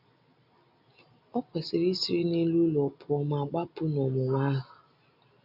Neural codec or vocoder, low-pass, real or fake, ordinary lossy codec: none; 5.4 kHz; real; none